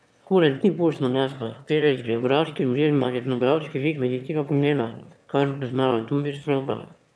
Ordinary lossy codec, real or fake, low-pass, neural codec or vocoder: none; fake; none; autoencoder, 22.05 kHz, a latent of 192 numbers a frame, VITS, trained on one speaker